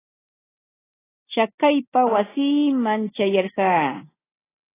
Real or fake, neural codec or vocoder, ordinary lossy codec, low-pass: real; none; AAC, 16 kbps; 3.6 kHz